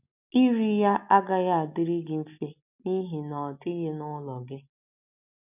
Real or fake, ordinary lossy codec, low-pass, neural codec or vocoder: real; none; 3.6 kHz; none